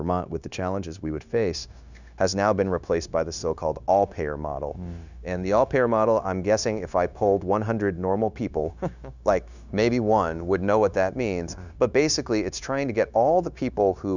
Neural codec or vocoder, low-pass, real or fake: codec, 16 kHz, 0.9 kbps, LongCat-Audio-Codec; 7.2 kHz; fake